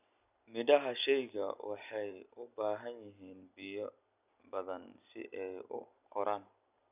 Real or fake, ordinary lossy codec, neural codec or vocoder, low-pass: real; none; none; 3.6 kHz